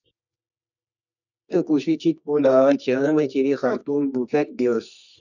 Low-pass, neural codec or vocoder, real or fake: 7.2 kHz; codec, 24 kHz, 0.9 kbps, WavTokenizer, medium music audio release; fake